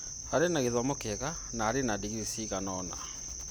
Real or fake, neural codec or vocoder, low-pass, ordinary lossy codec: real; none; none; none